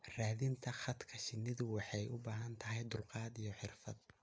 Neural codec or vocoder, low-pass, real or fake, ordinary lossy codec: none; none; real; none